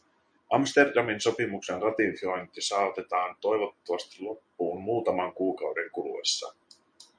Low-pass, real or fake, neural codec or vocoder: 9.9 kHz; real; none